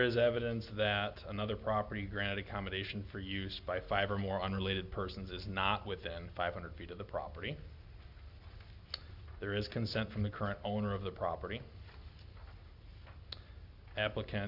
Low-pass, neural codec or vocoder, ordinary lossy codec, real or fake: 5.4 kHz; none; Opus, 64 kbps; real